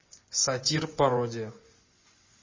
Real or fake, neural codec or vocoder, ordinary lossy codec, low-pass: fake; vocoder, 24 kHz, 100 mel bands, Vocos; MP3, 32 kbps; 7.2 kHz